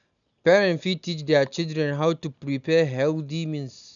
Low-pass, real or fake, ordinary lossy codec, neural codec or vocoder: 7.2 kHz; real; none; none